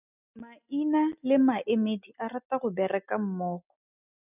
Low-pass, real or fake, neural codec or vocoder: 3.6 kHz; real; none